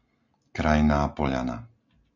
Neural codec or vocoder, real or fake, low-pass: none; real; 7.2 kHz